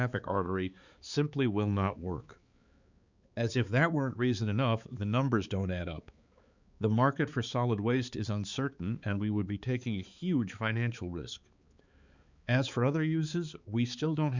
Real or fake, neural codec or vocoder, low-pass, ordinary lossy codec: fake; codec, 16 kHz, 4 kbps, X-Codec, HuBERT features, trained on balanced general audio; 7.2 kHz; Opus, 64 kbps